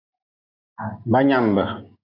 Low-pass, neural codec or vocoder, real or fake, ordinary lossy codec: 5.4 kHz; none; real; AAC, 32 kbps